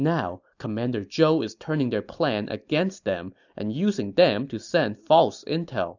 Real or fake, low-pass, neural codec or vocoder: real; 7.2 kHz; none